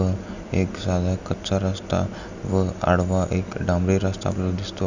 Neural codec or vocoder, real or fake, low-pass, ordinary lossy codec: none; real; 7.2 kHz; none